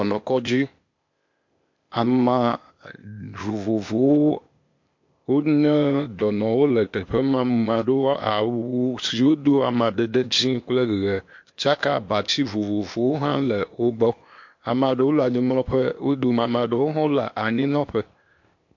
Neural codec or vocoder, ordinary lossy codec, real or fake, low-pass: codec, 16 kHz, 0.8 kbps, ZipCodec; MP3, 48 kbps; fake; 7.2 kHz